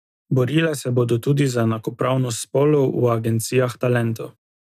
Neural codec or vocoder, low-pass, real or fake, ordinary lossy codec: none; 14.4 kHz; real; none